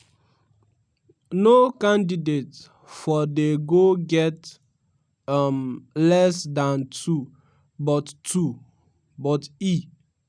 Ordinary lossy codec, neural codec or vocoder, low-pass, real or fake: none; none; 9.9 kHz; real